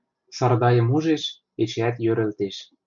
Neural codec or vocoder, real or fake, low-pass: none; real; 7.2 kHz